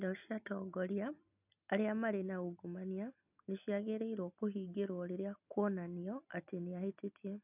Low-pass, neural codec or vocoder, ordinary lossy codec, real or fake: 3.6 kHz; none; none; real